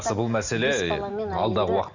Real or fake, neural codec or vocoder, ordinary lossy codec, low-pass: real; none; none; 7.2 kHz